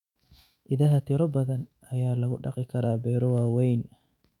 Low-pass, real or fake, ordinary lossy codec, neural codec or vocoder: 19.8 kHz; fake; MP3, 96 kbps; autoencoder, 48 kHz, 128 numbers a frame, DAC-VAE, trained on Japanese speech